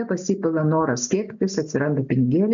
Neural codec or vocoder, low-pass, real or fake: none; 7.2 kHz; real